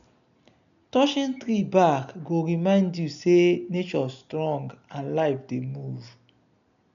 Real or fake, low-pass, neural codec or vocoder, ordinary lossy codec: real; 7.2 kHz; none; none